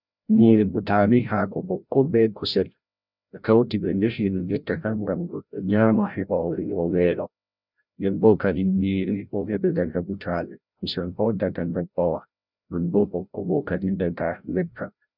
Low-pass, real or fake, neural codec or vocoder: 5.4 kHz; fake; codec, 16 kHz, 0.5 kbps, FreqCodec, larger model